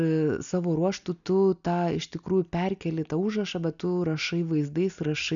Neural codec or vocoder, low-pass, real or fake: none; 7.2 kHz; real